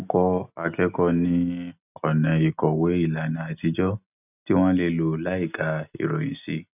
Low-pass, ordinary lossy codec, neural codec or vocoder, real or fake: 3.6 kHz; none; none; real